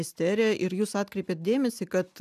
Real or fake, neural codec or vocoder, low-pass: real; none; 14.4 kHz